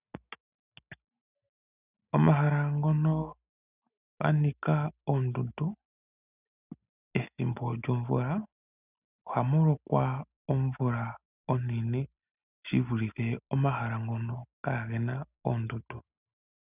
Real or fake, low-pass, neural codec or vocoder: real; 3.6 kHz; none